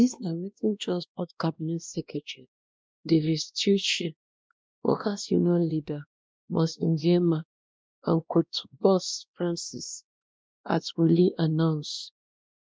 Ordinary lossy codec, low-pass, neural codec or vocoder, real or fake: none; none; codec, 16 kHz, 1 kbps, X-Codec, WavLM features, trained on Multilingual LibriSpeech; fake